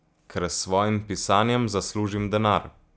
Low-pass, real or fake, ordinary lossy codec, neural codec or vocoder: none; real; none; none